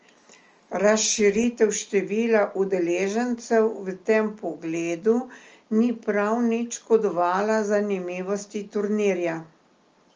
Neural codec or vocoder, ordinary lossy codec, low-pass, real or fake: none; Opus, 24 kbps; 7.2 kHz; real